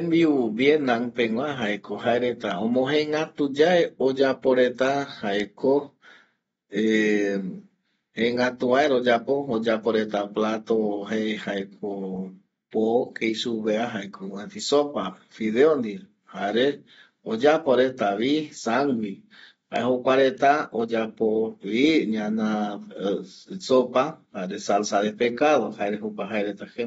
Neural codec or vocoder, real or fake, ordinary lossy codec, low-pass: none; real; AAC, 24 kbps; 10.8 kHz